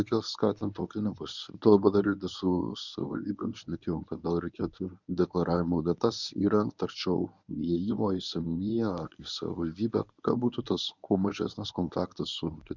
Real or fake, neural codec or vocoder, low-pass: fake; codec, 24 kHz, 0.9 kbps, WavTokenizer, medium speech release version 1; 7.2 kHz